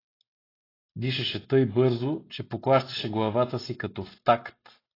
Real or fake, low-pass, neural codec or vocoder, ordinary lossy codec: real; 5.4 kHz; none; AAC, 24 kbps